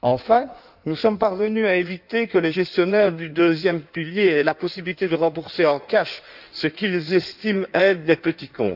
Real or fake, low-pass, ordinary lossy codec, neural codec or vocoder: fake; 5.4 kHz; none; codec, 16 kHz in and 24 kHz out, 1.1 kbps, FireRedTTS-2 codec